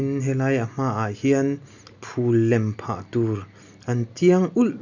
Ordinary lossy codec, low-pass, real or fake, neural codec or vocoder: none; 7.2 kHz; real; none